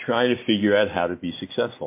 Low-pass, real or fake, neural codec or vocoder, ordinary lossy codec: 3.6 kHz; real; none; MP3, 24 kbps